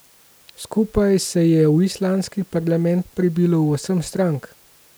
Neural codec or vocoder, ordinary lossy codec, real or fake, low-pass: none; none; real; none